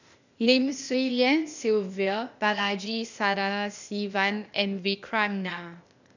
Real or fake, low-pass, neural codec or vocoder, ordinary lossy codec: fake; 7.2 kHz; codec, 16 kHz, 0.8 kbps, ZipCodec; none